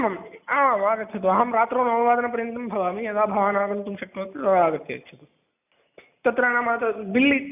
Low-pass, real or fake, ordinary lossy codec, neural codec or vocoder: 3.6 kHz; real; none; none